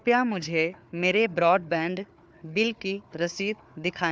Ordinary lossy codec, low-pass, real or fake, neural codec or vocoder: none; none; fake; codec, 16 kHz, 4 kbps, FunCodec, trained on Chinese and English, 50 frames a second